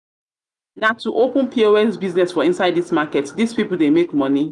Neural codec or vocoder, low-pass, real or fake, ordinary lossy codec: none; 10.8 kHz; real; none